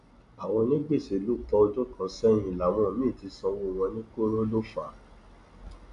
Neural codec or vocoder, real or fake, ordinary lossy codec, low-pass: none; real; none; 10.8 kHz